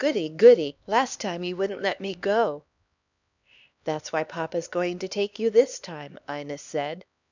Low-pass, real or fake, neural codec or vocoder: 7.2 kHz; fake; codec, 16 kHz, 2 kbps, X-Codec, HuBERT features, trained on LibriSpeech